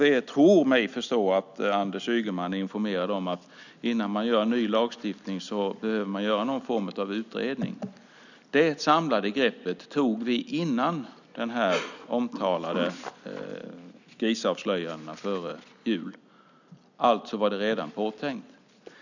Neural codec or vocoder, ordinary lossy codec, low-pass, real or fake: none; none; 7.2 kHz; real